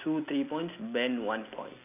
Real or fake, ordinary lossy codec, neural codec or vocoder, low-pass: real; none; none; 3.6 kHz